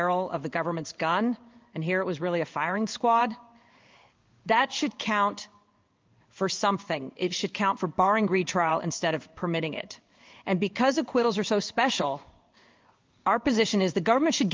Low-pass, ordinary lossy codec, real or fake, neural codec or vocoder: 7.2 kHz; Opus, 24 kbps; fake; codec, 16 kHz in and 24 kHz out, 1 kbps, XY-Tokenizer